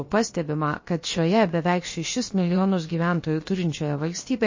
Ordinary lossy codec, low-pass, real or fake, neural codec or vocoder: MP3, 32 kbps; 7.2 kHz; fake; codec, 16 kHz, about 1 kbps, DyCAST, with the encoder's durations